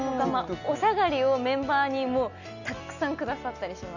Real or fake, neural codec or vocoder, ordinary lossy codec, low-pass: real; none; none; 7.2 kHz